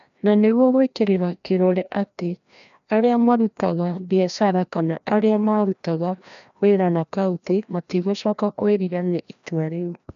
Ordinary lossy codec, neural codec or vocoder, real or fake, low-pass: AAC, 96 kbps; codec, 16 kHz, 1 kbps, FreqCodec, larger model; fake; 7.2 kHz